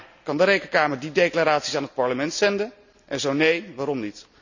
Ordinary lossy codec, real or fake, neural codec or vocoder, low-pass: none; real; none; 7.2 kHz